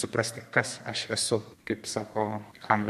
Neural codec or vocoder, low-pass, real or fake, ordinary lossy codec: codec, 32 kHz, 1.9 kbps, SNAC; 14.4 kHz; fake; MP3, 64 kbps